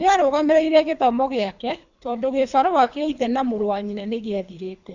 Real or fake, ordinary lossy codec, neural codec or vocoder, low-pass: fake; Opus, 64 kbps; codec, 24 kHz, 3 kbps, HILCodec; 7.2 kHz